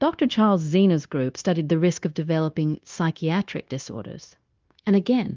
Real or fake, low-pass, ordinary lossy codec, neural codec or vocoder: fake; 7.2 kHz; Opus, 24 kbps; codec, 16 kHz, 0.9 kbps, LongCat-Audio-Codec